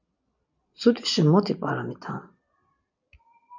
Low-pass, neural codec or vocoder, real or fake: 7.2 kHz; none; real